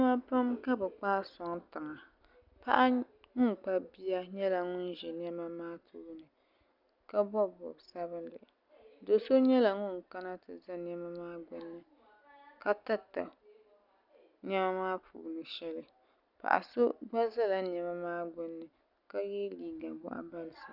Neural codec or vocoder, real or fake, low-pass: none; real; 5.4 kHz